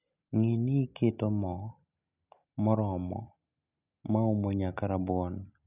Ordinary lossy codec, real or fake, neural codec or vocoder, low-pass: none; real; none; 3.6 kHz